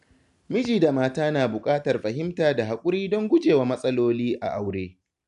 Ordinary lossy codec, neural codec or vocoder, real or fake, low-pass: none; none; real; 10.8 kHz